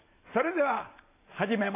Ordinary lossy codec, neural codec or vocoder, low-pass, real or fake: AAC, 24 kbps; none; 3.6 kHz; real